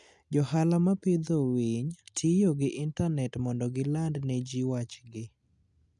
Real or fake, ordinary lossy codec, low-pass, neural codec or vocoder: real; none; 10.8 kHz; none